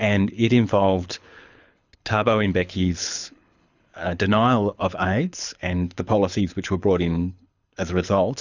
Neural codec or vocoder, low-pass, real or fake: codec, 24 kHz, 6 kbps, HILCodec; 7.2 kHz; fake